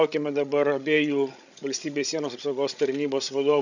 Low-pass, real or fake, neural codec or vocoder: 7.2 kHz; fake; codec, 16 kHz, 16 kbps, FreqCodec, larger model